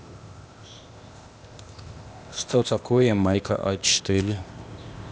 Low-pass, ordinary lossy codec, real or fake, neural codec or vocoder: none; none; fake; codec, 16 kHz, 0.8 kbps, ZipCodec